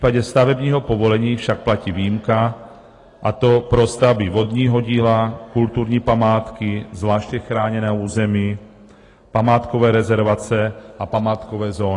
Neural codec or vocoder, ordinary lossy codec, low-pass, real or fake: none; AAC, 32 kbps; 10.8 kHz; real